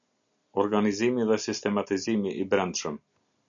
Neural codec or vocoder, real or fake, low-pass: none; real; 7.2 kHz